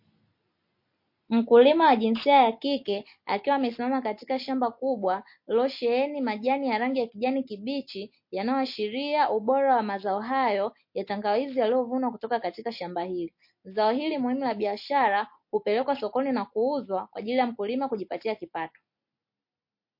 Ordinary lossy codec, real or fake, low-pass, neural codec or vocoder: MP3, 32 kbps; real; 5.4 kHz; none